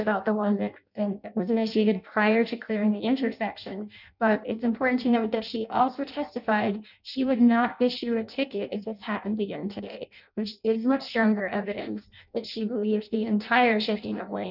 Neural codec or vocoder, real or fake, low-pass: codec, 16 kHz in and 24 kHz out, 0.6 kbps, FireRedTTS-2 codec; fake; 5.4 kHz